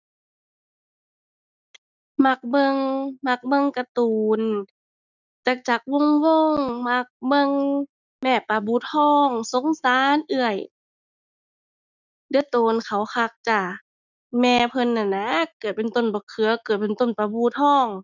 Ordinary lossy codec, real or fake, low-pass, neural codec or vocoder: none; real; 7.2 kHz; none